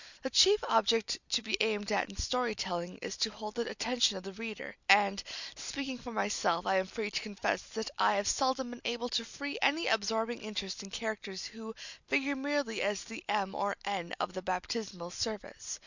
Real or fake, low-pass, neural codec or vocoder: real; 7.2 kHz; none